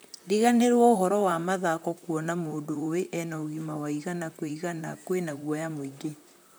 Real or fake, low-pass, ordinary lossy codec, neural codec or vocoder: fake; none; none; vocoder, 44.1 kHz, 128 mel bands, Pupu-Vocoder